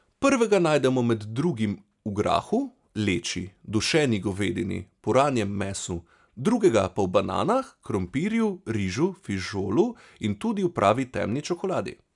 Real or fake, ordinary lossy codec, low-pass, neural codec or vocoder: real; none; 10.8 kHz; none